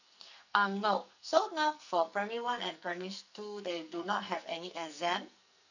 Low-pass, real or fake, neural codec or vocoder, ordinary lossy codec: 7.2 kHz; fake; codec, 44.1 kHz, 2.6 kbps, SNAC; none